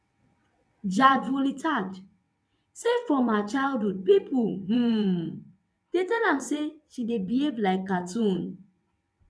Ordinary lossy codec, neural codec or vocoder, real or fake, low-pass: none; vocoder, 22.05 kHz, 80 mel bands, WaveNeXt; fake; none